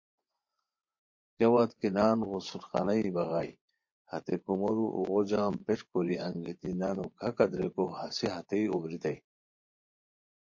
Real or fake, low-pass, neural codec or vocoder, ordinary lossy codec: fake; 7.2 kHz; vocoder, 24 kHz, 100 mel bands, Vocos; MP3, 48 kbps